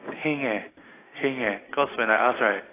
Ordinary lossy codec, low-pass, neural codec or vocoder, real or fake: AAC, 16 kbps; 3.6 kHz; none; real